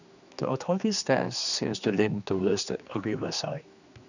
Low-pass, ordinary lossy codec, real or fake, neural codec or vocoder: 7.2 kHz; none; fake; codec, 16 kHz, 2 kbps, X-Codec, HuBERT features, trained on general audio